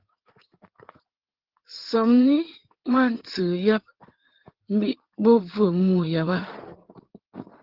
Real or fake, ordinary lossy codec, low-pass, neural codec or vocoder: fake; Opus, 16 kbps; 5.4 kHz; codec, 16 kHz in and 24 kHz out, 2.2 kbps, FireRedTTS-2 codec